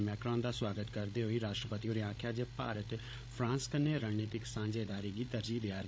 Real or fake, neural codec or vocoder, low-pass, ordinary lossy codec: fake; codec, 16 kHz, 16 kbps, FreqCodec, larger model; none; none